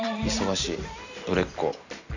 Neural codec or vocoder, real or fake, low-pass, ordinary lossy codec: vocoder, 44.1 kHz, 128 mel bands every 256 samples, BigVGAN v2; fake; 7.2 kHz; none